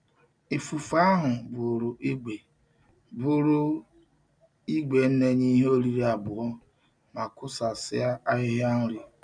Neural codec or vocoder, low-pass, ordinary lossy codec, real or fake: none; 9.9 kHz; none; real